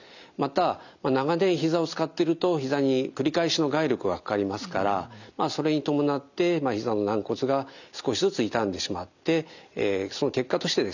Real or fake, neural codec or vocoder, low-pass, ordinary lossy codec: real; none; 7.2 kHz; none